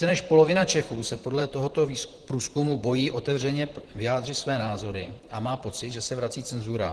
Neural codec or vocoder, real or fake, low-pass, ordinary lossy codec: vocoder, 44.1 kHz, 128 mel bands, Pupu-Vocoder; fake; 10.8 kHz; Opus, 16 kbps